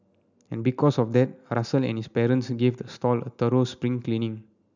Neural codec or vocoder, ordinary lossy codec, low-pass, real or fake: none; none; 7.2 kHz; real